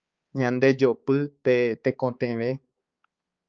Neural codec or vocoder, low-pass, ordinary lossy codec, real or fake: codec, 16 kHz, 4 kbps, X-Codec, HuBERT features, trained on balanced general audio; 7.2 kHz; Opus, 24 kbps; fake